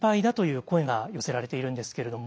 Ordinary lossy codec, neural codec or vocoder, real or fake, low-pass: none; none; real; none